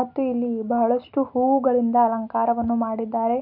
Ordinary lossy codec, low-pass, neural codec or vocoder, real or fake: none; 5.4 kHz; none; real